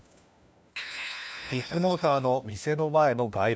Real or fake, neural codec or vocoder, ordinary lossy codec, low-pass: fake; codec, 16 kHz, 1 kbps, FunCodec, trained on LibriTTS, 50 frames a second; none; none